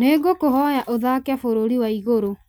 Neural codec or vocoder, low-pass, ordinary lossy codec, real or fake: none; none; none; real